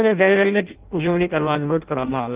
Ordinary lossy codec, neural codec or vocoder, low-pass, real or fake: Opus, 32 kbps; codec, 16 kHz in and 24 kHz out, 0.6 kbps, FireRedTTS-2 codec; 3.6 kHz; fake